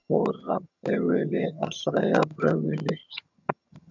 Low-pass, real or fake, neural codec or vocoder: 7.2 kHz; fake; vocoder, 22.05 kHz, 80 mel bands, HiFi-GAN